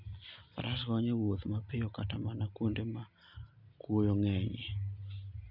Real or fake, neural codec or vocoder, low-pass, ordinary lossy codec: real; none; 5.4 kHz; none